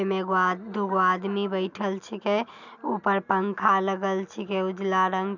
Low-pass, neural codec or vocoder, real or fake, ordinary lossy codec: 7.2 kHz; none; real; none